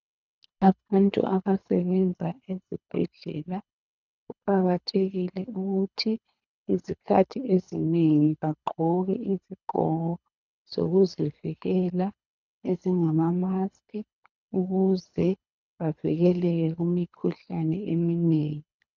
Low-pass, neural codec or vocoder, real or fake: 7.2 kHz; codec, 24 kHz, 3 kbps, HILCodec; fake